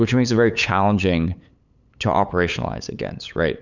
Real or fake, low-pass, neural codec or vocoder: fake; 7.2 kHz; codec, 16 kHz, 8 kbps, FunCodec, trained on LibriTTS, 25 frames a second